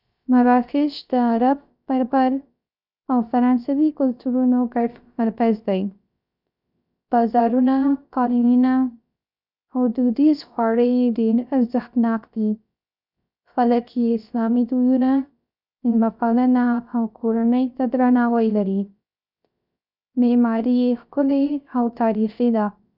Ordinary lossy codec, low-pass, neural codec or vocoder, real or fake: none; 5.4 kHz; codec, 16 kHz, 0.3 kbps, FocalCodec; fake